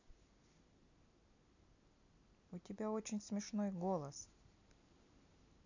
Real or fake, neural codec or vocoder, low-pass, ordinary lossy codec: real; none; 7.2 kHz; none